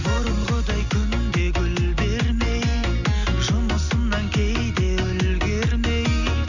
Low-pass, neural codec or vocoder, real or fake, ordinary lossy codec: 7.2 kHz; none; real; none